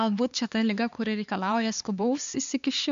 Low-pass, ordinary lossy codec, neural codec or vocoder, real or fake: 7.2 kHz; MP3, 64 kbps; codec, 16 kHz, 4 kbps, X-Codec, HuBERT features, trained on LibriSpeech; fake